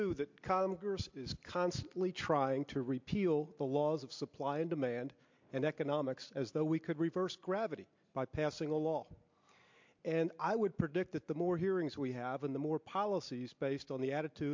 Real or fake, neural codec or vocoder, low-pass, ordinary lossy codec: real; none; 7.2 kHz; MP3, 48 kbps